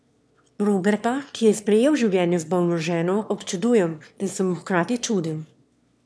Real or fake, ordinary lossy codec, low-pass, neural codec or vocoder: fake; none; none; autoencoder, 22.05 kHz, a latent of 192 numbers a frame, VITS, trained on one speaker